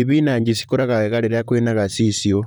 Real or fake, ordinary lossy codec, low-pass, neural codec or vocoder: fake; none; none; vocoder, 44.1 kHz, 128 mel bands, Pupu-Vocoder